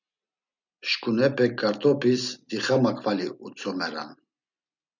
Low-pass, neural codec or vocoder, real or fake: 7.2 kHz; none; real